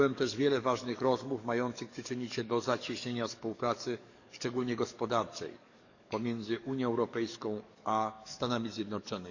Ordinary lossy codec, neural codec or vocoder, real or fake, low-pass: none; codec, 44.1 kHz, 7.8 kbps, DAC; fake; 7.2 kHz